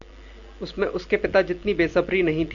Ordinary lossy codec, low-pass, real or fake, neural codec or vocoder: Opus, 64 kbps; 7.2 kHz; real; none